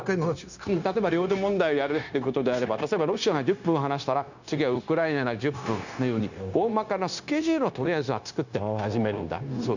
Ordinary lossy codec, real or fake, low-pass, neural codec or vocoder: none; fake; 7.2 kHz; codec, 16 kHz, 0.9 kbps, LongCat-Audio-Codec